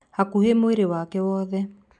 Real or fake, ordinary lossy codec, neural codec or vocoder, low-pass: real; none; none; 10.8 kHz